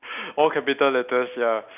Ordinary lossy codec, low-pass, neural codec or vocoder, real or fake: none; 3.6 kHz; none; real